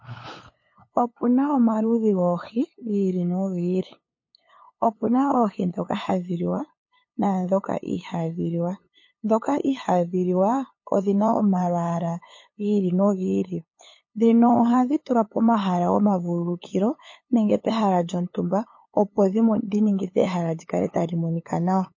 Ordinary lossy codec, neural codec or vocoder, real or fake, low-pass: MP3, 32 kbps; codec, 16 kHz, 8 kbps, FunCodec, trained on LibriTTS, 25 frames a second; fake; 7.2 kHz